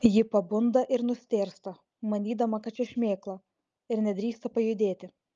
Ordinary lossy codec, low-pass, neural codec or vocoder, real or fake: Opus, 24 kbps; 7.2 kHz; none; real